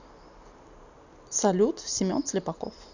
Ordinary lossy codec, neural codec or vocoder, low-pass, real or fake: none; none; 7.2 kHz; real